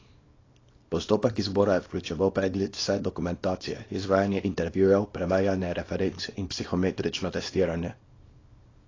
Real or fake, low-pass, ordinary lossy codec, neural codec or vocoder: fake; 7.2 kHz; AAC, 32 kbps; codec, 24 kHz, 0.9 kbps, WavTokenizer, small release